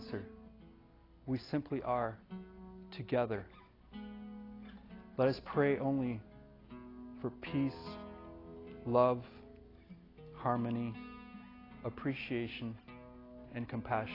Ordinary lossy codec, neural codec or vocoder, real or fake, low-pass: AAC, 24 kbps; none; real; 5.4 kHz